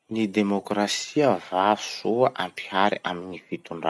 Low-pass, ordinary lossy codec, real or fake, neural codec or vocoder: none; none; real; none